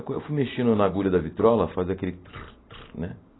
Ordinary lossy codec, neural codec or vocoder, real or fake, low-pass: AAC, 16 kbps; none; real; 7.2 kHz